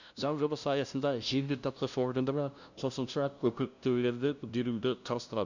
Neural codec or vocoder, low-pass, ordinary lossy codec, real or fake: codec, 16 kHz, 0.5 kbps, FunCodec, trained on LibriTTS, 25 frames a second; 7.2 kHz; none; fake